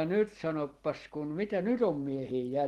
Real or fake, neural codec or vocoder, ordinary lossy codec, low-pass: real; none; Opus, 16 kbps; 19.8 kHz